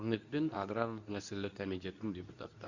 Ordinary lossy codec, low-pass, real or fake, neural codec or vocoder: none; 7.2 kHz; fake; codec, 24 kHz, 0.9 kbps, WavTokenizer, medium speech release version 2